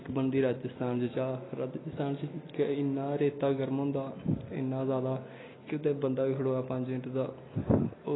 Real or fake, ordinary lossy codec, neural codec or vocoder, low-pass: real; AAC, 16 kbps; none; 7.2 kHz